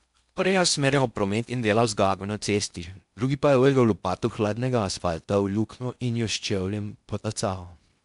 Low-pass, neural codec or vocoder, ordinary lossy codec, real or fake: 10.8 kHz; codec, 16 kHz in and 24 kHz out, 0.6 kbps, FocalCodec, streaming, 4096 codes; MP3, 96 kbps; fake